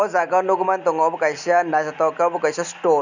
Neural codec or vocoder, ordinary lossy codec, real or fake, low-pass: none; none; real; 7.2 kHz